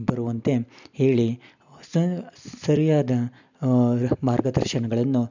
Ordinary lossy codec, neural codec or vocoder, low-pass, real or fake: none; none; 7.2 kHz; real